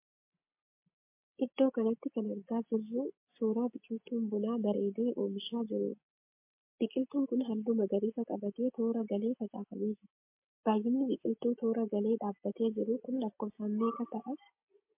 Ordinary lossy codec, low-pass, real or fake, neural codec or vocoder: MP3, 32 kbps; 3.6 kHz; real; none